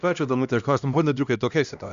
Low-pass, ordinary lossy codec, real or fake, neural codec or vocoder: 7.2 kHz; Opus, 64 kbps; fake; codec, 16 kHz, 1 kbps, X-Codec, HuBERT features, trained on LibriSpeech